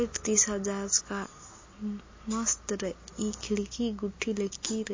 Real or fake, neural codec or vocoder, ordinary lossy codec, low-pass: real; none; MP3, 32 kbps; 7.2 kHz